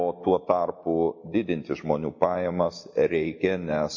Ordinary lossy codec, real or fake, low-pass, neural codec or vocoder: MP3, 32 kbps; real; 7.2 kHz; none